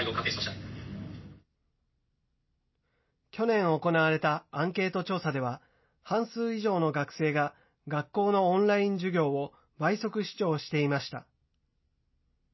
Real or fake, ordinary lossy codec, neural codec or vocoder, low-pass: real; MP3, 24 kbps; none; 7.2 kHz